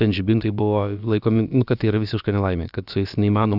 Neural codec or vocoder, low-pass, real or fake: codec, 16 kHz, about 1 kbps, DyCAST, with the encoder's durations; 5.4 kHz; fake